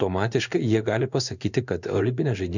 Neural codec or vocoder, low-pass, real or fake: codec, 16 kHz in and 24 kHz out, 1 kbps, XY-Tokenizer; 7.2 kHz; fake